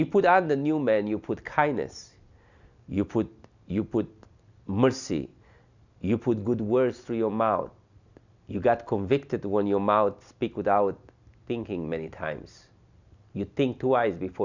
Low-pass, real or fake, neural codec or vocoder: 7.2 kHz; real; none